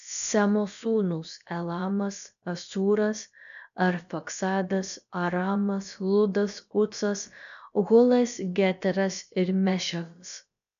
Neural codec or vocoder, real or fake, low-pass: codec, 16 kHz, about 1 kbps, DyCAST, with the encoder's durations; fake; 7.2 kHz